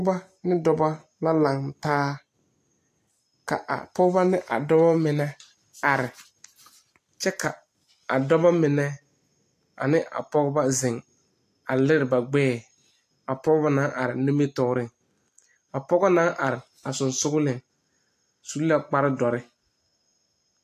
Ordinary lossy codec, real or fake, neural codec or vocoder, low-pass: AAC, 64 kbps; real; none; 14.4 kHz